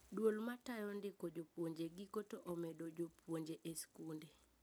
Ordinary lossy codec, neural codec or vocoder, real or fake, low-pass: none; none; real; none